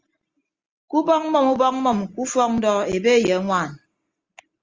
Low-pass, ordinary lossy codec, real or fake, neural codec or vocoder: 7.2 kHz; Opus, 32 kbps; real; none